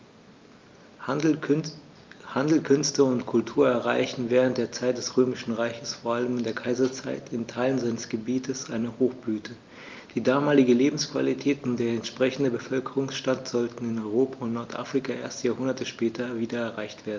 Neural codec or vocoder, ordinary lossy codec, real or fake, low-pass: none; Opus, 24 kbps; real; 7.2 kHz